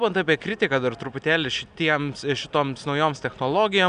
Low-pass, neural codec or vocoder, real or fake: 9.9 kHz; none; real